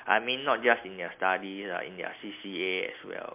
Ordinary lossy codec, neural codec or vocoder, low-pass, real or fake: MP3, 32 kbps; none; 3.6 kHz; real